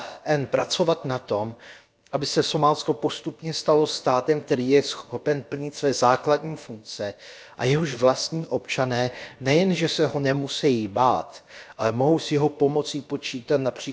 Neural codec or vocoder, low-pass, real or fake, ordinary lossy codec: codec, 16 kHz, about 1 kbps, DyCAST, with the encoder's durations; none; fake; none